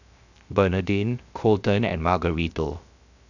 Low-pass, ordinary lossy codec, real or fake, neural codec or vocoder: 7.2 kHz; none; fake; codec, 16 kHz, 0.7 kbps, FocalCodec